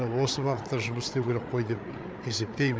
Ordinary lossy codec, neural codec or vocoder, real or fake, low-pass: none; codec, 16 kHz, 8 kbps, FreqCodec, larger model; fake; none